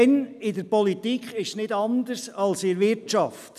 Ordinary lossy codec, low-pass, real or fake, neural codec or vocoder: none; 14.4 kHz; real; none